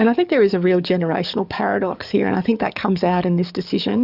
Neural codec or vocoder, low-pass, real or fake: codec, 44.1 kHz, 7.8 kbps, DAC; 5.4 kHz; fake